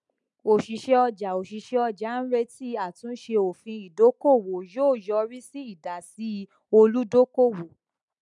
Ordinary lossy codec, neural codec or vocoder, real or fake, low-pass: MP3, 96 kbps; none; real; 10.8 kHz